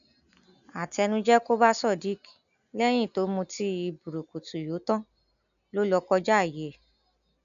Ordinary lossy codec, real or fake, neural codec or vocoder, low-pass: none; real; none; 7.2 kHz